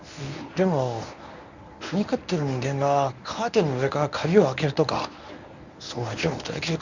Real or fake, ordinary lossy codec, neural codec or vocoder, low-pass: fake; none; codec, 24 kHz, 0.9 kbps, WavTokenizer, medium speech release version 1; 7.2 kHz